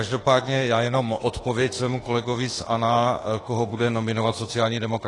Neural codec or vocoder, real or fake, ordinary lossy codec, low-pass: autoencoder, 48 kHz, 32 numbers a frame, DAC-VAE, trained on Japanese speech; fake; AAC, 32 kbps; 10.8 kHz